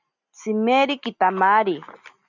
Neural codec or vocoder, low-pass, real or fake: none; 7.2 kHz; real